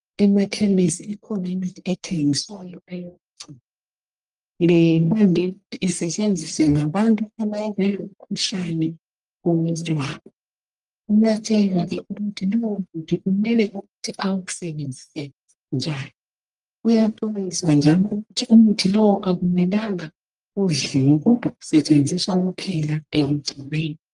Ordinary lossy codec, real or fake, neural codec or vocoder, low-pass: Opus, 32 kbps; fake; codec, 44.1 kHz, 1.7 kbps, Pupu-Codec; 10.8 kHz